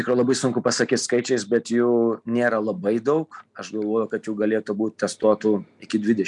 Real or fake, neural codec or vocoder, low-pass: real; none; 10.8 kHz